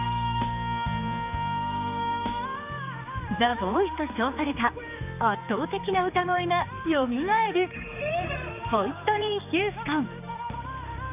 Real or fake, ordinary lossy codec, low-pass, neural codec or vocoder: fake; none; 3.6 kHz; codec, 16 kHz, 4 kbps, X-Codec, HuBERT features, trained on general audio